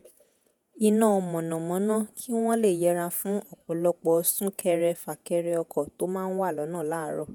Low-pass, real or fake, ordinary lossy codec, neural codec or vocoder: none; fake; none; vocoder, 48 kHz, 128 mel bands, Vocos